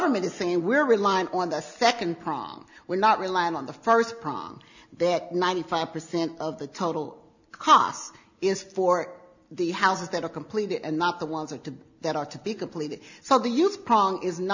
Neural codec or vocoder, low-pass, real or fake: none; 7.2 kHz; real